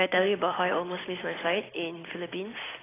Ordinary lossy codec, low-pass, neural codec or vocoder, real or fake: AAC, 16 kbps; 3.6 kHz; none; real